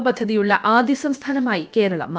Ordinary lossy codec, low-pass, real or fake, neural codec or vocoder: none; none; fake; codec, 16 kHz, about 1 kbps, DyCAST, with the encoder's durations